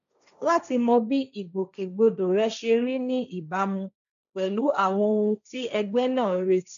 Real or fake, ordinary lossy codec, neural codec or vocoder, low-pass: fake; none; codec, 16 kHz, 1.1 kbps, Voila-Tokenizer; 7.2 kHz